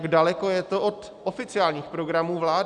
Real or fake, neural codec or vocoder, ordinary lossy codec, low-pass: real; none; Opus, 64 kbps; 10.8 kHz